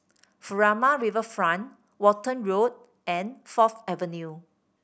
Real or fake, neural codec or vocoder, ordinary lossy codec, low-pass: real; none; none; none